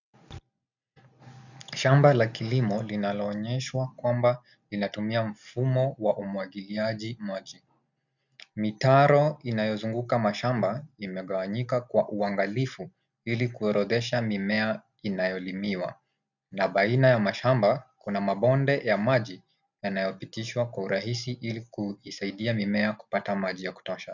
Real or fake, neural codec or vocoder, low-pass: real; none; 7.2 kHz